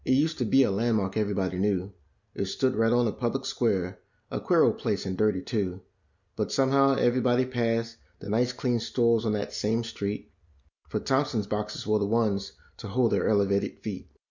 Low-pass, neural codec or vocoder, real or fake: 7.2 kHz; none; real